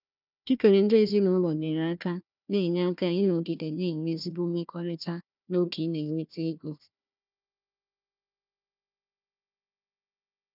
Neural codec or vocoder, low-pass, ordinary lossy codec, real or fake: codec, 16 kHz, 1 kbps, FunCodec, trained on Chinese and English, 50 frames a second; 5.4 kHz; none; fake